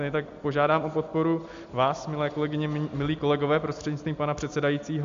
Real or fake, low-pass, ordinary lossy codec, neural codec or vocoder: real; 7.2 kHz; AAC, 64 kbps; none